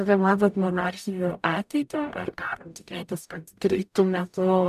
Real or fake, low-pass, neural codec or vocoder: fake; 14.4 kHz; codec, 44.1 kHz, 0.9 kbps, DAC